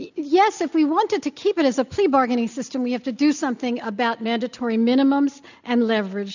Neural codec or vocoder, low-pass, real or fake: none; 7.2 kHz; real